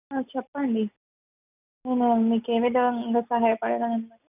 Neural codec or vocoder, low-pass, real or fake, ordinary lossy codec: none; 3.6 kHz; real; none